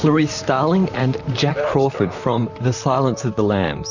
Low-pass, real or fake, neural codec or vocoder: 7.2 kHz; fake; vocoder, 44.1 kHz, 128 mel bands, Pupu-Vocoder